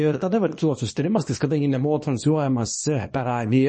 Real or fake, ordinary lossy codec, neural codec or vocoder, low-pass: fake; MP3, 32 kbps; codec, 24 kHz, 0.9 kbps, WavTokenizer, small release; 10.8 kHz